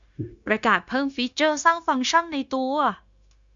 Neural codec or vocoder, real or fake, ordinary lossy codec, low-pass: codec, 16 kHz, 0.9 kbps, LongCat-Audio-Codec; fake; Opus, 64 kbps; 7.2 kHz